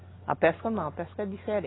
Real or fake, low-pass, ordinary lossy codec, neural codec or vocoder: real; 7.2 kHz; AAC, 16 kbps; none